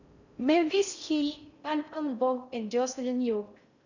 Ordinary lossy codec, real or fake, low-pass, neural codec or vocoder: none; fake; 7.2 kHz; codec, 16 kHz in and 24 kHz out, 0.6 kbps, FocalCodec, streaming, 4096 codes